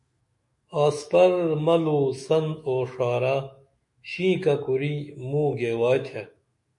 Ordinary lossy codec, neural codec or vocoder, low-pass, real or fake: MP3, 48 kbps; autoencoder, 48 kHz, 128 numbers a frame, DAC-VAE, trained on Japanese speech; 10.8 kHz; fake